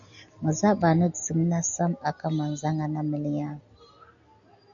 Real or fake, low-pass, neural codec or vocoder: real; 7.2 kHz; none